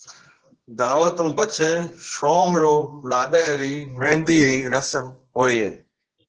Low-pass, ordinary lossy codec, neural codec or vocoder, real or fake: 9.9 kHz; Opus, 16 kbps; codec, 24 kHz, 0.9 kbps, WavTokenizer, medium music audio release; fake